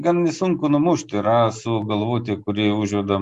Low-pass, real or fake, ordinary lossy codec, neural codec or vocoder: 10.8 kHz; real; Opus, 64 kbps; none